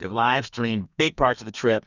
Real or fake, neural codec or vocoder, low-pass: fake; codec, 32 kHz, 1.9 kbps, SNAC; 7.2 kHz